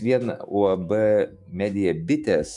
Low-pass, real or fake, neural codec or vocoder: 10.8 kHz; fake; autoencoder, 48 kHz, 128 numbers a frame, DAC-VAE, trained on Japanese speech